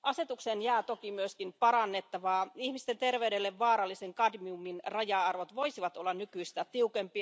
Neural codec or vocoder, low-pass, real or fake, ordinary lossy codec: none; none; real; none